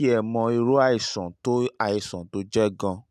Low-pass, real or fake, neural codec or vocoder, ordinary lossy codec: 14.4 kHz; real; none; none